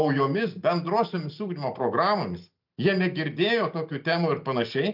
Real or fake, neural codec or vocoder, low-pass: real; none; 5.4 kHz